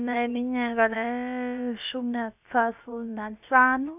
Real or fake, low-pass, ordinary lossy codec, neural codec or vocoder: fake; 3.6 kHz; none; codec, 16 kHz, about 1 kbps, DyCAST, with the encoder's durations